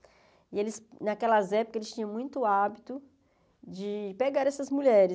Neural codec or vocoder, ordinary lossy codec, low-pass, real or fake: none; none; none; real